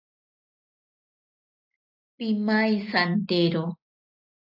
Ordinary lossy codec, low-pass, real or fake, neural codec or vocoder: AAC, 48 kbps; 5.4 kHz; real; none